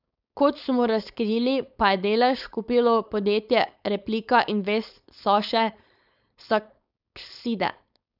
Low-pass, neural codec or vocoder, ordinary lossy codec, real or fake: 5.4 kHz; codec, 16 kHz, 4.8 kbps, FACodec; none; fake